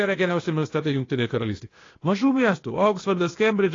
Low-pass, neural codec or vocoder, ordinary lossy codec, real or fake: 7.2 kHz; codec, 16 kHz, 0.8 kbps, ZipCodec; AAC, 32 kbps; fake